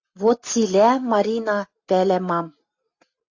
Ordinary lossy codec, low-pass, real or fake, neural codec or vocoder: AAC, 48 kbps; 7.2 kHz; real; none